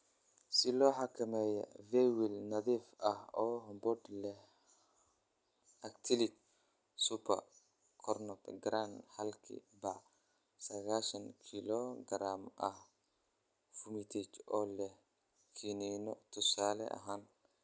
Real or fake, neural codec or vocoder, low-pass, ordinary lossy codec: real; none; none; none